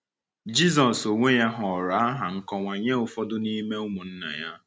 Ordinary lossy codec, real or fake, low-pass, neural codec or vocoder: none; real; none; none